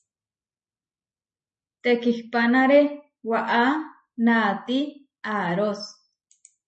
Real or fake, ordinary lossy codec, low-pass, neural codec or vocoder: real; MP3, 32 kbps; 10.8 kHz; none